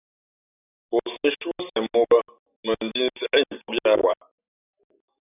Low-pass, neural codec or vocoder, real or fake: 3.6 kHz; none; real